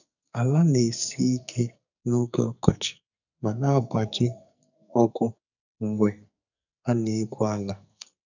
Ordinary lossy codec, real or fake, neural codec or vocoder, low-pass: none; fake; codec, 44.1 kHz, 2.6 kbps, SNAC; 7.2 kHz